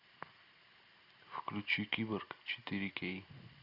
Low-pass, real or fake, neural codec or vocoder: 5.4 kHz; real; none